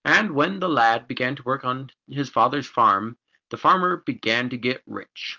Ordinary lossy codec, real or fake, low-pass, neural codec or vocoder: Opus, 32 kbps; real; 7.2 kHz; none